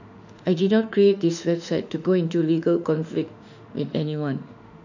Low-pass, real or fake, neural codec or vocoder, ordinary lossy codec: 7.2 kHz; fake; autoencoder, 48 kHz, 32 numbers a frame, DAC-VAE, trained on Japanese speech; none